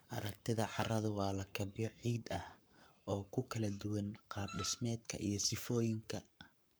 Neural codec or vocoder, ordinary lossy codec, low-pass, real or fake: codec, 44.1 kHz, 7.8 kbps, Pupu-Codec; none; none; fake